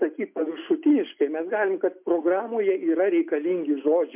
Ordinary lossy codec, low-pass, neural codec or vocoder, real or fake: MP3, 32 kbps; 3.6 kHz; none; real